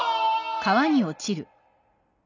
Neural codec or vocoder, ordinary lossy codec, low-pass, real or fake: none; none; 7.2 kHz; real